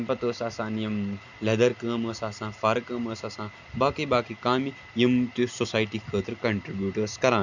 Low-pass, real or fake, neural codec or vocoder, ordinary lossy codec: 7.2 kHz; real; none; none